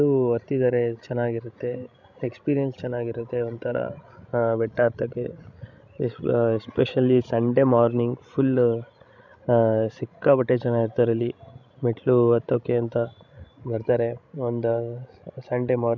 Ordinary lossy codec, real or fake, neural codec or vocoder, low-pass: none; fake; codec, 16 kHz, 16 kbps, FreqCodec, larger model; 7.2 kHz